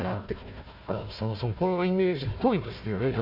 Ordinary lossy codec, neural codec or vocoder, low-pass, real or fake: AAC, 48 kbps; codec, 16 kHz, 1 kbps, FunCodec, trained on Chinese and English, 50 frames a second; 5.4 kHz; fake